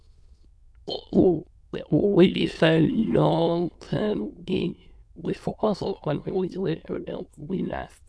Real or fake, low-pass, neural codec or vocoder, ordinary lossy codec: fake; none; autoencoder, 22.05 kHz, a latent of 192 numbers a frame, VITS, trained on many speakers; none